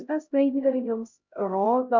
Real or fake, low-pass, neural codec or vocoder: fake; 7.2 kHz; codec, 16 kHz, 0.5 kbps, X-Codec, HuBERT features, trained on LibriSpeech